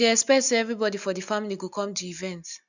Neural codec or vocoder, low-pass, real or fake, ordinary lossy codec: none; 7.2 kHz; real; none